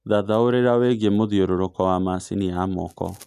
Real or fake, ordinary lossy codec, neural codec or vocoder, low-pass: real; none; none; 14.4 kHz